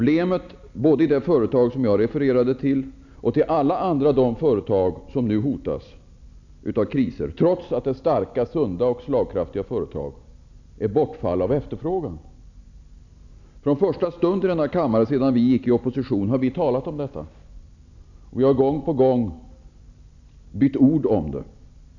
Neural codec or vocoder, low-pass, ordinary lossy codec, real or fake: none; 7.2 kHz; none; real